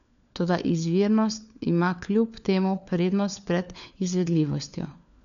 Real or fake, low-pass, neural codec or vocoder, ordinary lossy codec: fake; 7.2 kHz; codec, 16 kHz, 4 kbps, FunCodec, trained on LibriTTS, 50 frames a second; none